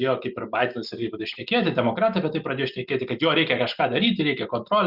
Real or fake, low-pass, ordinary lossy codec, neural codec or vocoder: real; 5.4 kHz; Opus, 64 kbps; none